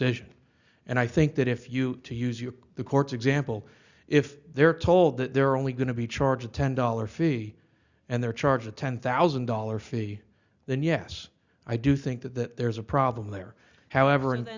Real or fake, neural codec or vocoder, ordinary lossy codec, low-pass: real; none; Opus, 64 kbps; 7.2 kHz